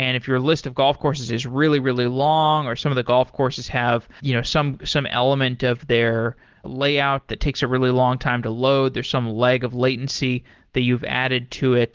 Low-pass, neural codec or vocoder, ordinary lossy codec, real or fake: 7.2 kHz; none; Opus, 16 kbps; real